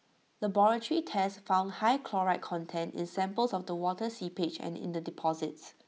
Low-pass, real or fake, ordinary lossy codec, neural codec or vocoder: none; real; none; none